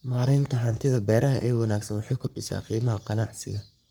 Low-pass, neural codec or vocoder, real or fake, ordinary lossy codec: none; codec, 44.1 kHz, 3.4 kbps, Pupu-Codec; fake; none